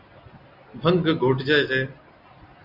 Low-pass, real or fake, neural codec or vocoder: 5.4 kHz; real; none